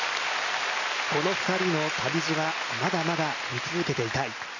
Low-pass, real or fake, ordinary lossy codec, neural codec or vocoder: 7.2 kHz; real; none; none